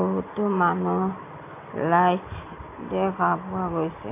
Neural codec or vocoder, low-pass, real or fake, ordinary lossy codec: none; 3.6 kHz; real; AAC, 24 kbps